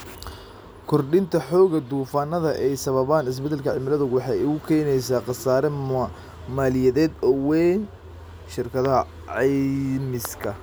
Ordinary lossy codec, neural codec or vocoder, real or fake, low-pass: none; none; real; none